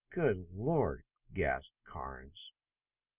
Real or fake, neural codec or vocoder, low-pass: real; none; 3.6 kHz